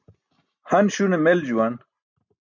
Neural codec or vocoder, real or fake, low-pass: none; real; 7.2 kHz